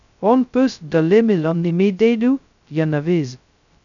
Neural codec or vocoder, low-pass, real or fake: codec, 16 kHz, 0.2 kbps, FocalCodec; 7.2 kHz; fake